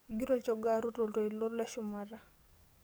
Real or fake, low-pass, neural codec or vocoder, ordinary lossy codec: fake; none; codec, 44.1 kHz, 7.8 kbps, DAC; none